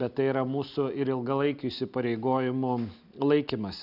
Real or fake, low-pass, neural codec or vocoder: real; 5.4 kHz; none